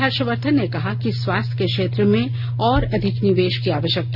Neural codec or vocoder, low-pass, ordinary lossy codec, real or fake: none; 5.4 kHz; none; real